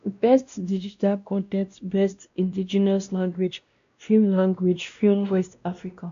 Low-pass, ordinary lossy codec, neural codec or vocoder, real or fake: 7.2 kHz; MP3, 64 kbps; codec, 16 kHz, 1 kbps, X-Codec, WavLM features, trained on Multilingual LibriSpeech; fake